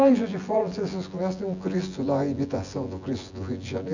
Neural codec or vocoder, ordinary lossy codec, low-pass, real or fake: vocoder, 24 kHz, 100 mel bands, Vocos; none; 7.2 kHz; fake